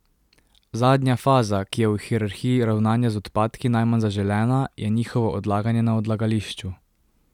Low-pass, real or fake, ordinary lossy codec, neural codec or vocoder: 19.8 kHz; real; none; none